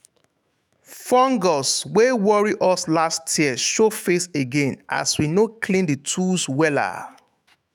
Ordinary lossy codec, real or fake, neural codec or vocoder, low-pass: none; fake; autoencoder, 48 kHz, 128 numbers a frame, DAC-VAE, trained on Japanese speech; none